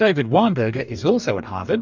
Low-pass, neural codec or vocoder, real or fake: 7.2 kHz; codec, 44.1 kHz, 2.6 kbps, DAC; fake